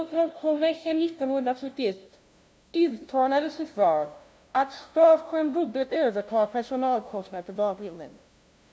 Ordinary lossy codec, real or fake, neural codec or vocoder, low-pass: none; fake; codec, 16 kHz, 0.5 kbps, FunCodec, trained on LibriTTS, 25 frames a second; none